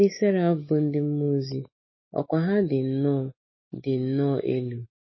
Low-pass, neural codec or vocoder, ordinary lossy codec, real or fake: 7.2 kHz; autoencoder, 48 kHz, 128 numbers a frame, DAC-VAE, trained on Japanese speech; MP3, 24 kbps; fake